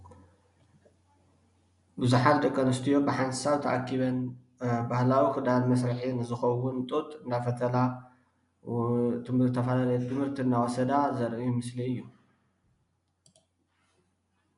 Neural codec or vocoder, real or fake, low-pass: none; real; 10.8 kHz